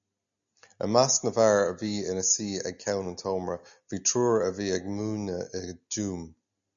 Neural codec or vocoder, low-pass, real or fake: none; 7.2 kHz; real